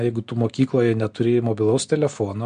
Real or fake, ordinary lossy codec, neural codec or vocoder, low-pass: real; MP3, 64 kbps; none; 9.9 kHz